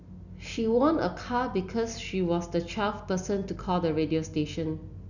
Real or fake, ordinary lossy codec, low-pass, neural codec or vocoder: real; none; 7.2 kHz; none